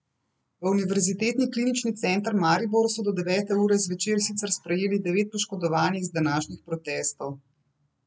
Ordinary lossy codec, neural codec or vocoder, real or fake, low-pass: none; none; real; none